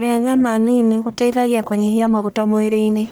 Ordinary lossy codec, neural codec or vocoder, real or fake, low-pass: none; codec, 44.1 kHz, 1.7 kbps, Pupu-Codec; fake; none